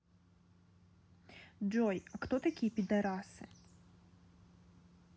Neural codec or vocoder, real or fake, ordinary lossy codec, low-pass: none; real; none; none